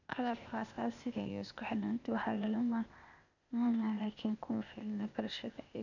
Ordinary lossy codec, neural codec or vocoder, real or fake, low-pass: none; codec, 16 kHz, 0.8 kbps, ZipCodec; fake; 7.2 kHz